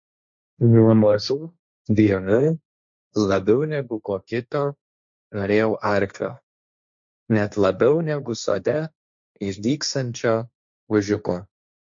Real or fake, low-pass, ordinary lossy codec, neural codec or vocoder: fake; 7.2 kHz; MP3, 48 kbps; codec, 16 kHz, 1.1 kbps, Voila-Tokenizer